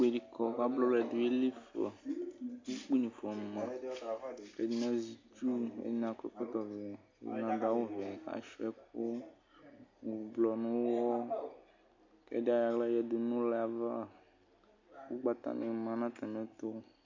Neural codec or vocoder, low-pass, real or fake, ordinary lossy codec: none; 7.2 kHz; real; MP3, 64 kbps